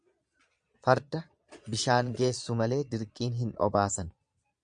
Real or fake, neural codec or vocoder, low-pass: fake; vocoder, 22.05 kHz, 80 mel bands, Vocos; 9.9 kHz